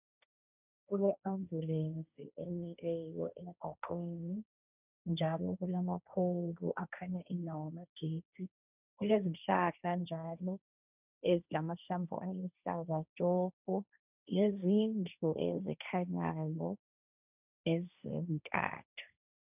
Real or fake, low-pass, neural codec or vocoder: fake; 3.6 kHz; codec, 16 kHz, 1.1 kbps, Voila-Tokenizer